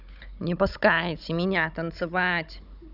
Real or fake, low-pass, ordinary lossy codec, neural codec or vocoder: fake; 5.4 kHz; none; codec, 16 kHz, 16 kbps, FunCodec, trained on Chinese and English, 50 frames a second